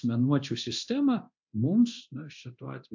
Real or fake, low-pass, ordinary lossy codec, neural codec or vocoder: fake; 7.2 kHz; MP3, 48 kbps; codec, 24 kHz, 0.9 kbps, DualCodec